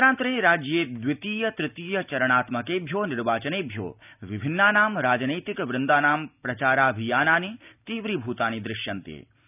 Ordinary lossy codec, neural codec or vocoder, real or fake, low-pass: none; none; real; 3.6 kHz